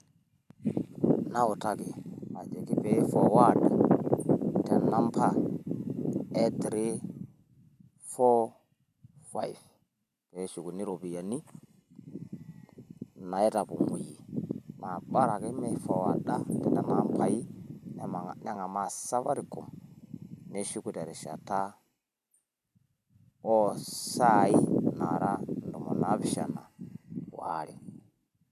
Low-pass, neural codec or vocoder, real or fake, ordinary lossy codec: 14.4 kHz; none; real; MP3, 96 kbps